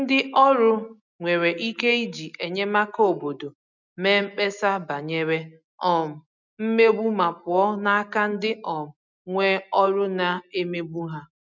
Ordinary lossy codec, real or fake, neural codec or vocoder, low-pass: none; real; none; 7.2 kHz